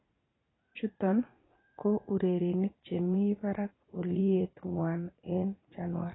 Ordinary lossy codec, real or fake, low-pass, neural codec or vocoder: AAC, 16 kbps; fake; 7.2 kHz; vocoder, 44.1 kHz, 80 mel bands, Vocos